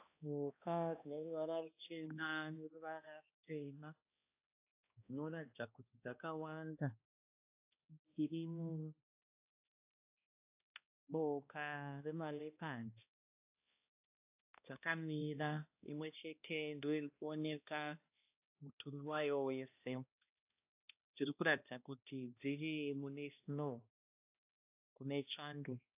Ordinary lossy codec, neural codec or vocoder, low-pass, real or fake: AAC, 32 kbps; codec, 16 kHz, 1 kbps, X-Codec, HuBERT features, trained on balanced general audio; 3.6 kHz; fake